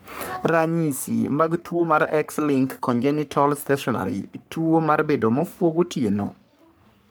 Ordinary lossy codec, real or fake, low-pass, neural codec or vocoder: none; fake; none; codec, 44.1 kHz, 3.4 kbps, Pupu-Codec